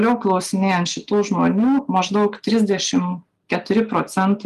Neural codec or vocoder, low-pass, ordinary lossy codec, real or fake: none; 14.4 kHz; Opus, 32 kbps; real